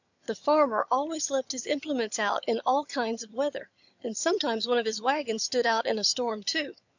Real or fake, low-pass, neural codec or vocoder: fake; 7.2 kHz; vocoder, 22.05 kHz, 80 mel bands, HiFi-GAN